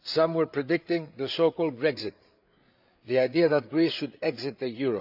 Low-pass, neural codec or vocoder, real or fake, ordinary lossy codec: 5.4 kHz; codec, 16 kHz, 8 kbps, FreqCodec, larger model; fake; none